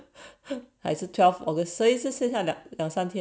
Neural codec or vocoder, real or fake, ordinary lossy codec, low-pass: none; real; none; none